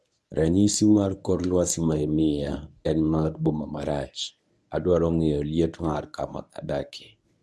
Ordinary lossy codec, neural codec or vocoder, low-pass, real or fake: none; codec, 24 kHz, 0.9 kbps, WavTokenizer, medium speech release version 1; none; fake